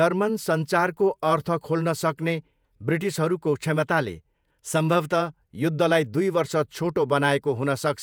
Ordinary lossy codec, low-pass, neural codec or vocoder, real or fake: none; none; vocoder, 48 kHz, 128 mel bands, Vocos; fake